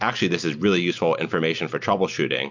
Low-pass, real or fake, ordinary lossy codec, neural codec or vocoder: 7.2 kHz; real; MP3, 64 kbps; none